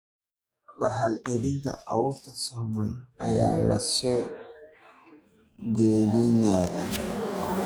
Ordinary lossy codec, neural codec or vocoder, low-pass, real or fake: none; codec, 44.1 kHz, 2.6 kbps, DAC; none; fake